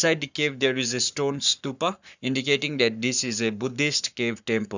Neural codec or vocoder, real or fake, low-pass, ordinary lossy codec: codec, 44.1 kHz, 7.8 kbps, Pupu-Codec; fake; 7.2 kHz; none